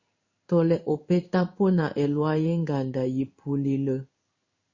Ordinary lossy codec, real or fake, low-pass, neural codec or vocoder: Opus, 64 kbps; fake; 7.2 kHz; codec, 16 kHz in and 24 kHz out, 1 kbps, XY-Tokenizer